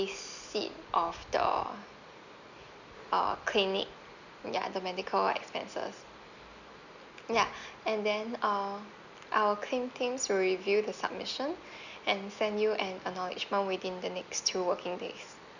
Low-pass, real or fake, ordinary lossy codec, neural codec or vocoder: 7.2 kHz; real; none; none